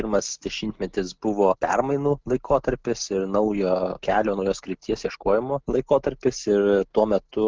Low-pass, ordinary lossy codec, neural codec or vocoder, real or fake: 7.2 kHz; Opus, 24 kbps; none; real